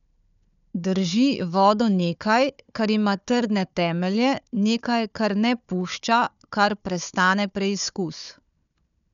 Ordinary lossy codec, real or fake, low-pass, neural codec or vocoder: none; fake; 7.2 kHz; codec, 16 kHz, 4 kbps, FunCodec, trained on Chinese and English, 50 frames a second